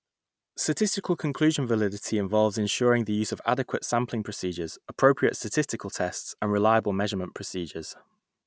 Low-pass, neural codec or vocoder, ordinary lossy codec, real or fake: none; none; none; real